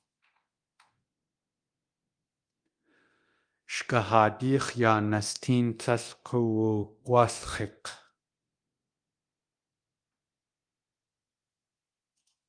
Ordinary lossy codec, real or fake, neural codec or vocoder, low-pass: Opus, 32 kbps; fake; codec, 24 kHz, 0.9 kbps, DualCodec; 9.9 kHz